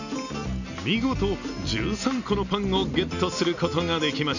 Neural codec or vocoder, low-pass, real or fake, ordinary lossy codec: none; 7.2 kHz; real; none